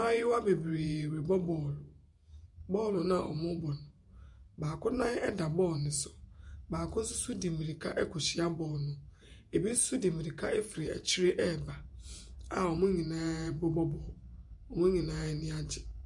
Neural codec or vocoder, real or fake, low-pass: vocoder, 48 kHz, 128 mel bands, Vocos; fake; 10.8 kHz